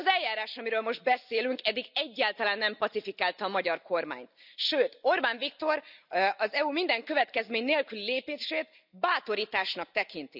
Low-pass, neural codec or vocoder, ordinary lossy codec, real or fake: 5.4 kHz; none; none; real